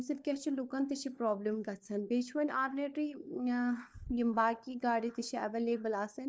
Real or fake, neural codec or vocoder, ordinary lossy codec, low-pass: fake; codec, 16 kHz, 4 kbps, FunCodec, trained on LibriTTS, 50 frames a second; none; none